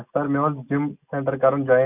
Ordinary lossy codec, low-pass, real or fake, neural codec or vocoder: none; 3.6 kHz; real; none